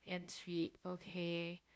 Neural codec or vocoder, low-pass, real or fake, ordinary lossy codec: codec, 16 kHz, 0.5 kbps, FunCodec, trained on LibriTTS, 25 frames a second; none; fake; none